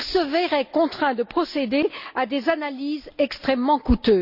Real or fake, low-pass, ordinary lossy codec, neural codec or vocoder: real; 5.4 kHz; none; none